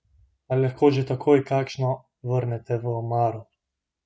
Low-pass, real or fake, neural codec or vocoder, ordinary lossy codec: none; real; none; none